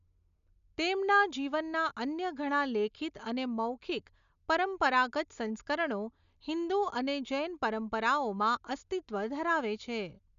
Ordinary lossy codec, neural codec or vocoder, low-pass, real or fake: none; none; 7.2 kHz; real